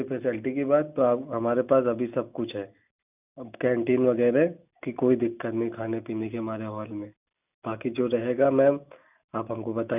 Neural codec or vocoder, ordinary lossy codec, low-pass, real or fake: none; none; 3.6 kHz; real